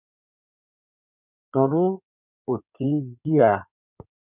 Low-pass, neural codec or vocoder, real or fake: 3.6 kHz; codec, 16 kHz in and 24 kHz out, 2.2 kbps, FireRedTTS-2 codec; fake